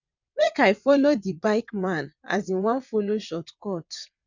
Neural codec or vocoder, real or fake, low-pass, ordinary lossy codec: vocoder, 22.05 kHz, 80 mel bands, WaveNeXt; fake; 7.2 kHz; none